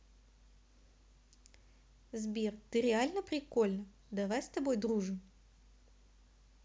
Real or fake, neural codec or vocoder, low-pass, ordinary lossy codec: real; none; none; none